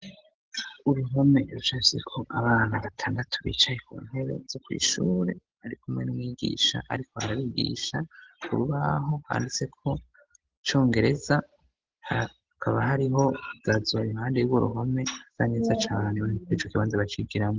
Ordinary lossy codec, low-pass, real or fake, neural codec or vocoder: Opus, 16 kbps; 7.2 kHz; real; none